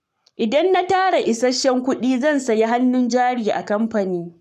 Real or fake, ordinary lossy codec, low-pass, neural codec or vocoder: fake; none; 14.4 kHz; codec, 44.1 kHz, 7.8 kbps, Pupu-Codec